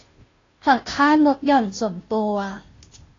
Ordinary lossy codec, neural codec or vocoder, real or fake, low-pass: AAC, 32 kbps; codec, 16 kHz, 0.5 kbps, FunCodec, trained on Chinese and English, 25 frames a second; fake; 7.2 kHz